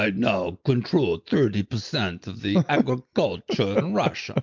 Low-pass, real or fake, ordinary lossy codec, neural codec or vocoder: 7.2 kHz; real; MP3, 64 kbps; none